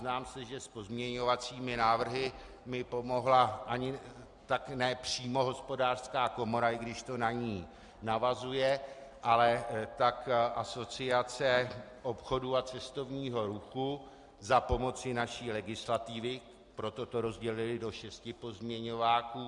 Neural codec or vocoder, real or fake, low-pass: none; real; 10.8 kHz